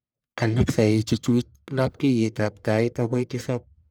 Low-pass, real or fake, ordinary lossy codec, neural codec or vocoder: none; fake; none; codec, 44.1 kHz, 1.7 kbps, Pupu-Codec